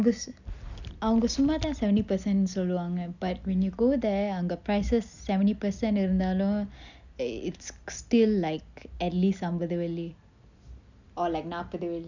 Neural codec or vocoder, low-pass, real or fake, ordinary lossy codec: none; 7.2 kHz; real; none